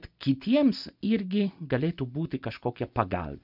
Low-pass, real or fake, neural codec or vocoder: 5.4 kHz; real; none